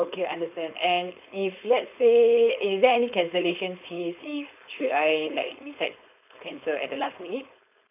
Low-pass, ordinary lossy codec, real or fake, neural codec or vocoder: 3.6 kHz; none; fake; codec, 16 kHz, 4.8 kbps, FACodec